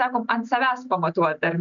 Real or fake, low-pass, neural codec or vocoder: real; 7.2 kHz; none